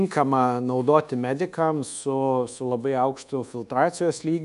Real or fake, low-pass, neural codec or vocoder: fake; 10.8 kHz; codec, 24 kHz, 1.2 kbps, DualCodec